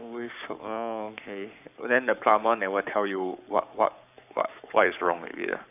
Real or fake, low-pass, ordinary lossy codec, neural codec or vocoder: fake; 3.6 kHz; none; codec, 44.1 kHz, 7.8 kbps, Pupu-Codec